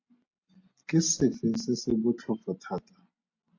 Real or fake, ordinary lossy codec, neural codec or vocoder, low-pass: real; AAC, 48 kbps; none; 7.2 kHz